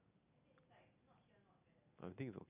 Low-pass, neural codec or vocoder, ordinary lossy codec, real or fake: 3.6 kHz; none; none; real